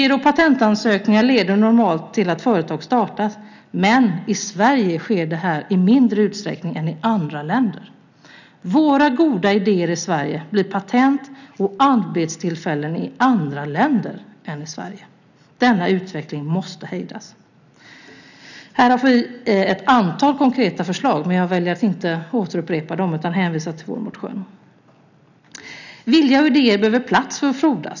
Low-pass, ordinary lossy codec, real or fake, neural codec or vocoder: 7.2 kHz; none; real; none